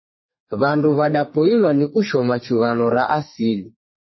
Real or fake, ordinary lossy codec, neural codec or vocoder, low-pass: fake; MP3, 24 kbps; codec, 32 kHz, 1.9 kbps, SNAC; 7.2 kHz